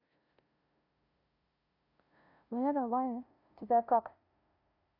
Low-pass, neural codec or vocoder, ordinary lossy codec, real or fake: 5.4 kHz; codec, 16 kHz, 0.5 kbps, FunCodec, trained on LibriTTS, 25 frames a second; none; fake